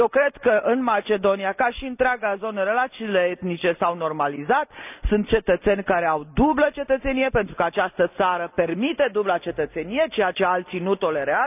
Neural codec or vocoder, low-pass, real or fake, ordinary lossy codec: none; 3.6 kHz; real; none